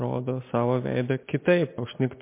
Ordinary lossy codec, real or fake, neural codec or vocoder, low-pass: MP3, 24 kbps; real; none; 3.6 kHz